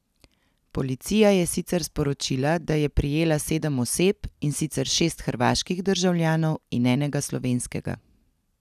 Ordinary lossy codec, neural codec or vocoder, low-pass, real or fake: none; none; 14.4 kHz; real